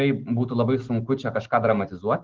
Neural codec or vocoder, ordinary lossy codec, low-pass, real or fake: none; Opus, 16 kbps; 7.2 kHz; real